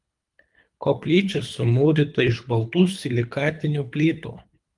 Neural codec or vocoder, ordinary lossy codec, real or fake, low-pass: codec, 24 kHz, 3 kbps, HILCodec; Opus, 32 kbps; fake; 10.8 kHz